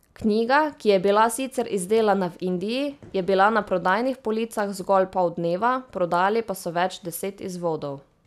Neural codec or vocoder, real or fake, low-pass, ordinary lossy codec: none; real; 14.4 kHz; none